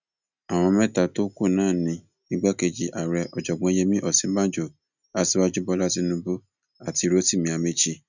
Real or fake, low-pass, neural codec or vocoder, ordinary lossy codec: real; 7.2 kHz; none; none